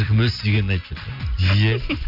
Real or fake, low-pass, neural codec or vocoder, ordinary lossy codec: real; 5.4 kHz; none; none